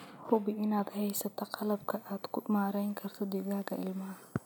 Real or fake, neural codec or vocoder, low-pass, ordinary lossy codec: real; none; none; none